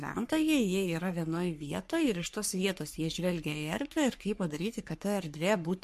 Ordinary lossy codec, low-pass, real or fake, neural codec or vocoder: MP3, 64 kbps; 14.4 kHz; fake; codec, 44.1 kHz, 3.4 kbps, Pupu-Codec